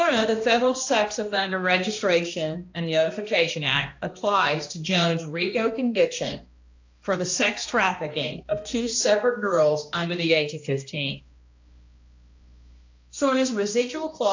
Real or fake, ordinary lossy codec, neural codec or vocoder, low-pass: fake; AAC, 48 kbps; codec, 16 kHz, 1 kbps, X-Codec, HuBERT features, trained on balanced general audio; 7.2 kHz